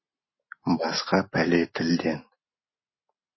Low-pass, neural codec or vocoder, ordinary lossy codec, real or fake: 7.2 kHz; none; MP3, 24 kbps; real